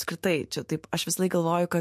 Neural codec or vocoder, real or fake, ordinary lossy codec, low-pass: autoencoder, 48 kHz, 128 numbers a frame, DAC-VAE, trained on Japanese speech; fake; MP3, 64 kbps; 14.4 kHz